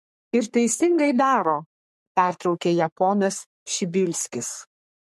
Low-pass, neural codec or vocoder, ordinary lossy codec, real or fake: 14.4 kHz; codec, 44.1 kHz, 3.4 kbps, Pupu-Codec; MP3, 64 kbps; fake